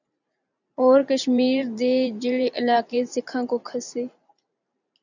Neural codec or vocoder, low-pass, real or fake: vocoder, 44.1 kHz, 128 mel bands every 512 samples, BigVGAN v2; 7.2 kHz; fake